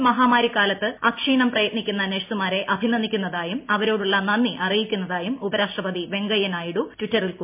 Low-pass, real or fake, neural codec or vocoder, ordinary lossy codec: 3.6 kHz; real; none; MP3, 32 kbps